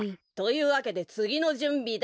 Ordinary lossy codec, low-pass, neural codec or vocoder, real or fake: none; none; none; real